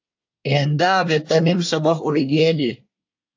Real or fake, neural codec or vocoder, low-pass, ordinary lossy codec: fake; codec, 24 kHz, 1 kbps, SNAC; 7.2 kHz; AAC, 48 kbps